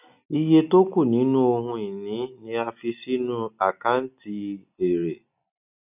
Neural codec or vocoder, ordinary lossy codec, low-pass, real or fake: none; none; 3.6 kHz; real